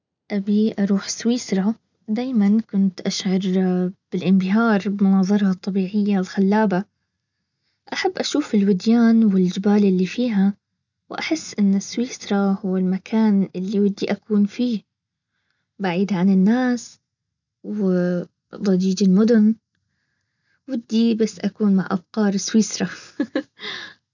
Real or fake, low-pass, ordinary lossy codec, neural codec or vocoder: real; 7.2 kHz; none; none